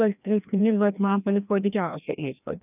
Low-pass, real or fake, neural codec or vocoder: 3.6 kHz; fake; codec, 16 kHz, 1 kbps, FreqCodec, larger model